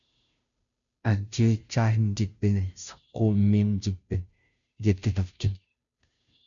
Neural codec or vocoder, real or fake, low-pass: codec, 16 kHz, 0.5 kbps, FunCodec, trained on Chinese and English, 25 frames a second; fake; 7.2 kHz